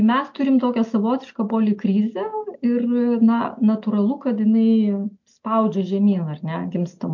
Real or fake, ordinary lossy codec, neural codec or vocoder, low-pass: real; MP3, 48 kbps; none; 7.2 kHz